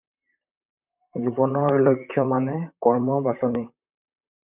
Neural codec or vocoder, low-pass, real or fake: vocoder, 44.1 kHz, 128 mel bands, Pupu-Vocoder; 3.6 kHz; fake